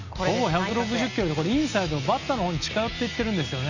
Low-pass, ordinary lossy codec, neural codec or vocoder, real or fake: 7.2 kHz; none; none; real